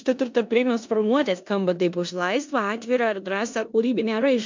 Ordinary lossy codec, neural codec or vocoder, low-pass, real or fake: MP3, 64 kbps; codec, 16 kHz in and 24 kHz out, 0.9 kbps, LongCat-Audio-Codec, four codebook decoder; 7.2 kHz; fake